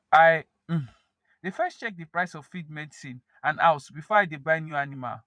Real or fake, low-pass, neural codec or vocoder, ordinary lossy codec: fake; 9.9 kHz; vocoder, 22.05 kHz, 80 mel bands, Vocos; none